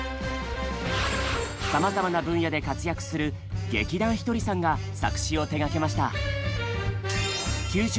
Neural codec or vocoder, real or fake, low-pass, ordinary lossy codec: none; real; none; none